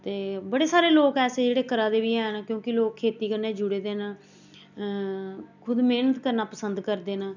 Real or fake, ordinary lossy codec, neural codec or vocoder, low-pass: real; none; none; 7.2 kHz